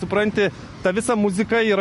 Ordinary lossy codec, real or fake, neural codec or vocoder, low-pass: MP3, 48 kbps; real; none; 14.4 kHz